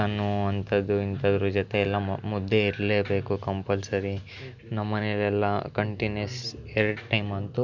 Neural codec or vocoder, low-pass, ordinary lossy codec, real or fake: none; 7.2 kHz; none; real